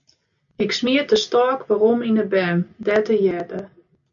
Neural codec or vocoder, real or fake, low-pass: none; real; 7.2 kHz